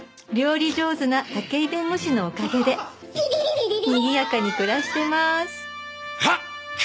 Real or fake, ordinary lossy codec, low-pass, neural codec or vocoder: real; none; none; none